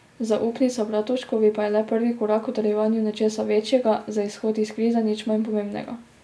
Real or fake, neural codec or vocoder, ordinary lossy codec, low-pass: real; none; none; none